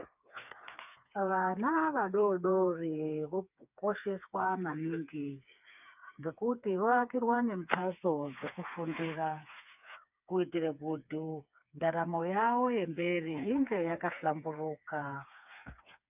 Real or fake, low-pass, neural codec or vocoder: fake; 3.6 kHz; codec, 16 kHz, 4 kbps, FreqCodec, smaller model